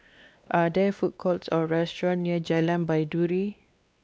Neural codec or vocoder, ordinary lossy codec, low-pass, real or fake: codec, 16 kHz, 2 kbps, X-Codec, WavLM features, trained on Multilingual LibriSpeech; none; none; fake